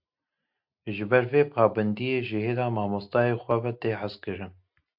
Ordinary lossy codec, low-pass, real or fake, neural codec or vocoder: AAC, 48 kbps; 5.4 kHz; real; none